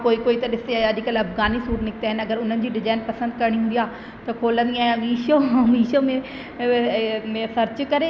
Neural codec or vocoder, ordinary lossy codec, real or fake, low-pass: none; none; real; none